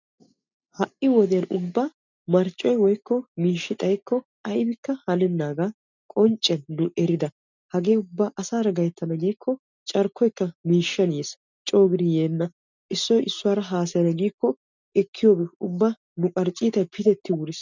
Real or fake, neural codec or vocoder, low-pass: real; none; 7.2 kHz